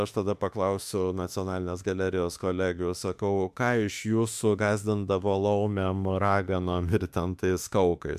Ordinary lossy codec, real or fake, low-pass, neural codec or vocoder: MP3, 96 kbps; fake; 14.4 kHz; autoencoder, 48 kHz, 32 numbers a frame, DAC-VAE, trained on Japanese speech